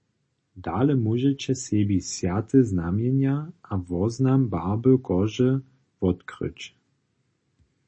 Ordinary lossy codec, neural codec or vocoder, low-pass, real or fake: MP3, 32 kbps; none; 9.9 kHz; real